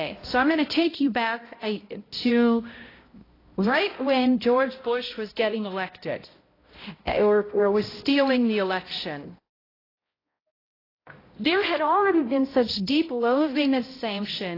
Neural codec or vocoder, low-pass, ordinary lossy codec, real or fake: codec, 16 kHz, 0.5 kbps, X-Codec, HuBERT features, trained on balanced general audio; 5.4 kHz; AAC, 24 kbps; fake